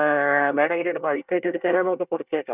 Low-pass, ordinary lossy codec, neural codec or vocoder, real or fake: 3.6 kHz; none; codec, 24 kHz, 1 kbps, SNAC; fake